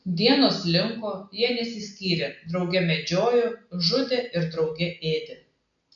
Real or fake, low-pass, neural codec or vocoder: real; 7.2 kHz; none